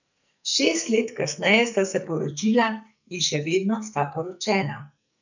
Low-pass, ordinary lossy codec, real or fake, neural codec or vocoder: 7.2 kHz; none; fake; codec, 44.1 kHz, 2.6 kbps, SNAC